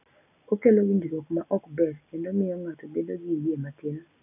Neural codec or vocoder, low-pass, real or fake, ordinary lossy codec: none; 3.6 kHz; real; none